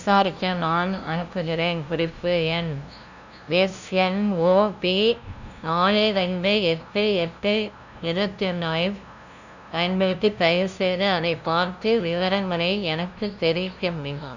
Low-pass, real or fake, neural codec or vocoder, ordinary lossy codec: 7.2 kHz; fake; codec, 16 kHz, 0.5 kbps, FunCodec, trained on LibriTTS, 25 frames a second; none